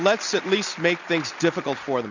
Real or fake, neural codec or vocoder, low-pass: real; none; 7.2 kHz